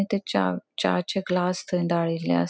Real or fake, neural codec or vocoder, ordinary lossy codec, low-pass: real; none; none; none